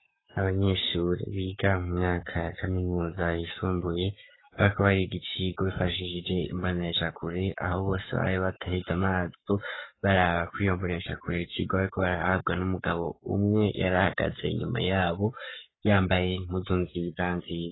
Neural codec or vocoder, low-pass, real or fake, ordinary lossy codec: codec, 44.1 kHz, 7.8 kbps, Pupu-Codec; 7.2 kHz; fake; AAC, 16 kbps